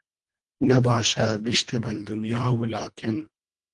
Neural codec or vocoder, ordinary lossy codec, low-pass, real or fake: codec, 24 kHz, 1.5 kbps, HILCodec; Opus, 32 kbps; 10.8 kHz; fake